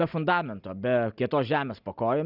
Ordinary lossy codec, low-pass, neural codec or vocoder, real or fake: Opus, 64 kbps; 5.4 kHz; none; real